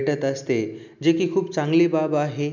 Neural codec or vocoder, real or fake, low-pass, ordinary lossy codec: none; real; 7.2 kHz; none